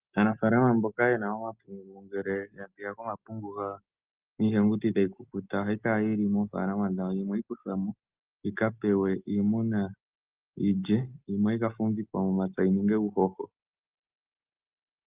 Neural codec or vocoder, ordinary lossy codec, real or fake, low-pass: none; Opus, 32 kbps; real; 3.6 kHz